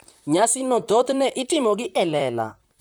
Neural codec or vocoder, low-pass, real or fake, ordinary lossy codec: vocoder, 44.1 kHz, 128 mel bands, Pupu-Vocoder; none; fake; none